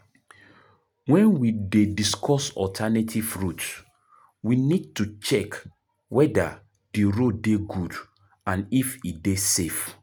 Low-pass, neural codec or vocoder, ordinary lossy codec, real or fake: none; none; none; real